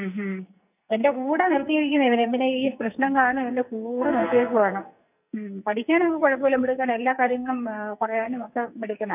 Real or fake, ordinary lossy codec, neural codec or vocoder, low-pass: fake; none; codec, 44.1 kHz, 2.6 kbps, SNAC; 3.6 kHz